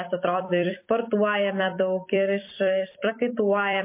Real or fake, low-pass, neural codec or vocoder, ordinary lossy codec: fake; 3.6 kHz; vocoder, 44.1 kHz, 128 mel bands every 256 samples, BigVGAN v2; MP3, 24 kbps